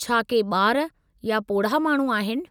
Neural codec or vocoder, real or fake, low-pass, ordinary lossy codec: none; real; none; none